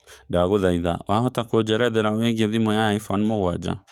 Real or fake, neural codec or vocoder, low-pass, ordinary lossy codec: fake; codec, 44.1 kHz, 7.8 kbps, DAC; 19.8 kHz; none